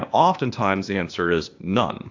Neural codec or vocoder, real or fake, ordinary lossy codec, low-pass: codec, 16 kHz, 0.8 kbps, ZipCodec; fake; AAC, 48 kbps; 7.2 kHz